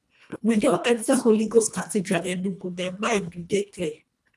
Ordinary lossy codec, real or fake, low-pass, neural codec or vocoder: none; fake; none; codec, 24 kHz, 1.5 kbps, HILCodec